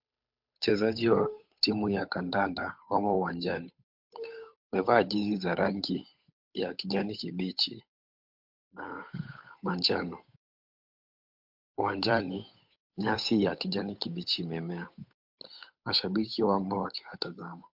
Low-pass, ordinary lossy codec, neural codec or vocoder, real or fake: 5.4 kHz; MP3, 48 kbps; codec, 16 kHz, 8 kbps, FunCodec, trained on Chinese and English, 25 frames a second; fake